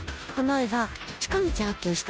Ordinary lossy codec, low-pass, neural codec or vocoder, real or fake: none; none; codec, 16 kHz, 0.5 kbps, FunCodec, trained on Chinese and English, 25 frames a second; fake